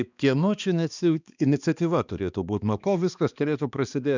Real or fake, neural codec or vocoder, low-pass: fake; codec, 16 kHz, 2 kbps, X-Codec, HuBERT features, trained on balanced general audio; 7.2 kHz